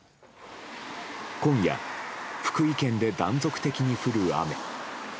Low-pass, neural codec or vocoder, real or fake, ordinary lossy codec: none; none; real; none